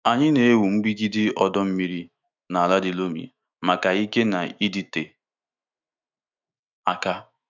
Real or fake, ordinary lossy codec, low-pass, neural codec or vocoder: fake; none; 7.2 kHz; autoencoder, 48 kHz, 128 numbers a frame, DAC-VAE, trained on Japanese speech